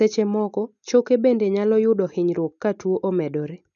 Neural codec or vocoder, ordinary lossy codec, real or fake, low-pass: none; none; real; 7.2 kHz